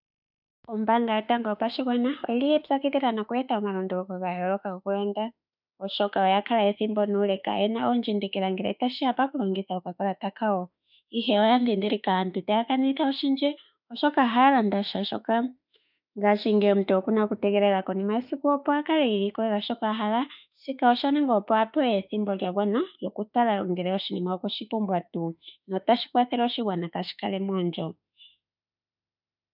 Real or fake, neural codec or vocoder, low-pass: fake; autoencoder, 48 kHz, 32 numbers a frame, DAC-VAE, trained on Japanese speech; 5.4 kHz